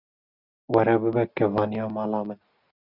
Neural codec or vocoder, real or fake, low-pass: none; real; 5.4 kHz